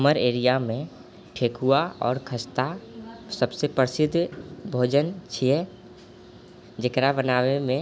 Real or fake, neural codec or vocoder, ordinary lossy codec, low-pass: real; none; none; none